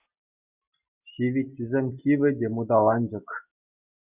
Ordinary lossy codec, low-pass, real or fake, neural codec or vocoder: Opus, 64 kbps; 3.6 kHz; real; none